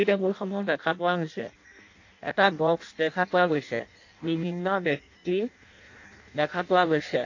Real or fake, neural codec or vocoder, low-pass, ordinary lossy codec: fake; codec, 16 kHz in and 24 kHz out, 0.6 kbps, FireRedTTS-2 codec; 7.2 kHz; AAC, 48 kbps